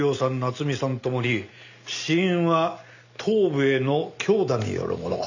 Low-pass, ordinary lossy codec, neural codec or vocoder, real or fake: 7.2 kHz; none; none; real